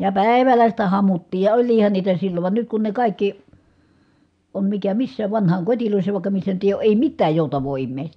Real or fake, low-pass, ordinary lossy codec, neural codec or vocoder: real; 9.9 kHz; MP3, 96 kbps; none